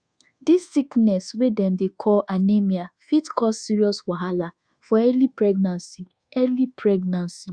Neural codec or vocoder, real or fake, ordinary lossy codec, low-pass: codec, 24 kHz, 1.2 kbps, DualCodec; fake; Opus, 64 kbps; 9.9 kHz